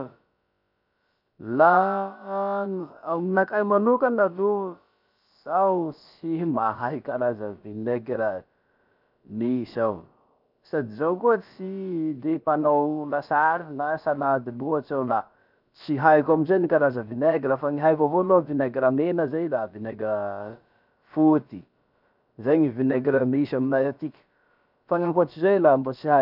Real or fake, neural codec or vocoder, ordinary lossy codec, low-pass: fake; codec, 16 kHz, about 1 kbps, DyCAST, with the encoder's durations; none; 5.4 kHz